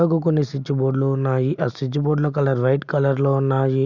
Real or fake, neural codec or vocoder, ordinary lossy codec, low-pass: real; none; none; 7.2 kHz